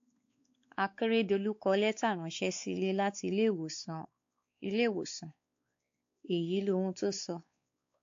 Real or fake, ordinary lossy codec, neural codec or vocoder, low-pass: fake; AAC, 48 kbps; codec, 16 kHz, 4 kbps, X-Codec, WavLM features, trained on Multilingual LibriSpeech; 7.2 kHz